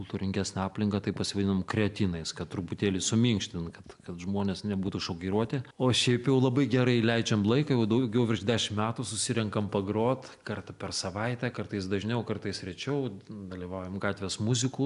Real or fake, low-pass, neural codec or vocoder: real; 10.8 kHz; none